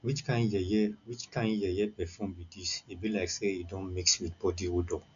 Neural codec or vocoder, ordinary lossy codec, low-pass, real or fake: none; none; 7.2 kHz; real